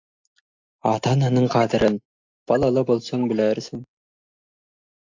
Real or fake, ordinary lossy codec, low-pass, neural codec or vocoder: real; AAC, 48 kbps; 7.2 kHz; none